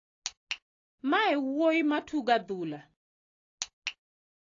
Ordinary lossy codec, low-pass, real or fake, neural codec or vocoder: AAC, 32 kbps; 7.2 kHz; real; none